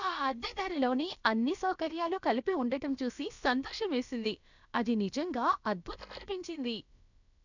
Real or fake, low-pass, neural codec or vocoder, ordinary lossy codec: fake; 7.2 kHz; codec, 16 kHz, about 1 kbps, DyCAST, with the encoder's durations; none